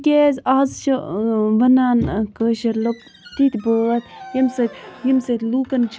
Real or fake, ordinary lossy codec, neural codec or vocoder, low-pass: real; none; none; none